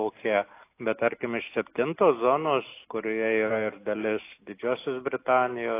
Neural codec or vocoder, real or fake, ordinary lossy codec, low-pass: none; real; AAC, 24 kbps; 3.6 kHz